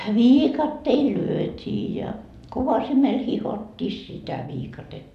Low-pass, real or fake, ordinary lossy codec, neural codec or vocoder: 14.4 kHz; real; none; none